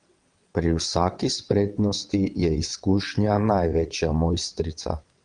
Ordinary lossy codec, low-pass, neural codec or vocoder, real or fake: Opus, 32 kbps; 9.9 kHz; vocoder, 22.05 kHz, 80 mel bands, WaveNeXt; fake